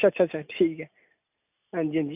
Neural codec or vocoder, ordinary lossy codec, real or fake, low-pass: none; none; real; 3.6 kHz